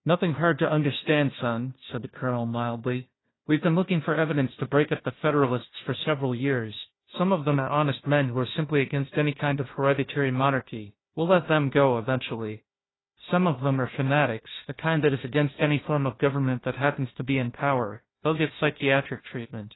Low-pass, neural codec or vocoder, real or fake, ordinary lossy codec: 7.2 kHz; codec, 16 kHz, 1 kbps, FunCodec, trained on Chinese and English, 50 frames a second; fake; AAC, 16 kbps